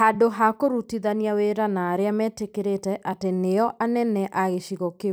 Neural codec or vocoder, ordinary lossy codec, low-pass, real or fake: vocoder, 44.1 kHz, 128 mel bands every 256 samples, BigVGAN v2; none; none; fake